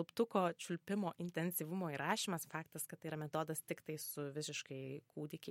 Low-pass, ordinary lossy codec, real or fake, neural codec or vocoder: 19.8 kHz; MP3, 64 kbps; real; none